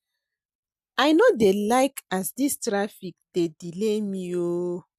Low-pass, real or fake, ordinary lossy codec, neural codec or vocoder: 14.4 kHz; real; MP3, 96 kbps; none